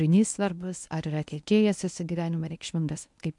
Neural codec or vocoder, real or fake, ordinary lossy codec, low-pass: codec, 24 kHz, 0.9 kbps, WavTokenizer, medium speech release version 1; fake; MP3, 64 kbps; 10.8 kHz